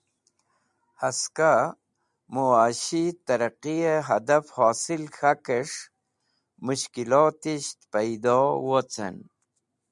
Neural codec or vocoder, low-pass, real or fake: none; 10.8 kHz; real